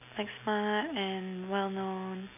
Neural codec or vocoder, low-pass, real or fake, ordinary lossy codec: none; 3.6 kHz; real; none